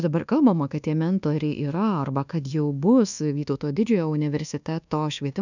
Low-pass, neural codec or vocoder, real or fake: 7.2 kHz; codec, 24 kHz, 1.2 kbps, DualCodec; fake